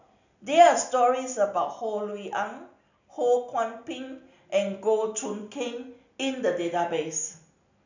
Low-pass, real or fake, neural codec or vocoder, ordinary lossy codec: 7.2 kHz; real; none; none